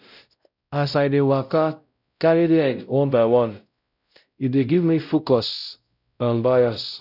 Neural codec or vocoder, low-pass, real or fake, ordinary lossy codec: codec, 16 kHz, 0.5 kbps, X-Codec, WavLM features, trained on Multilingual LibriSpeech; 5.4 kHz; fake; none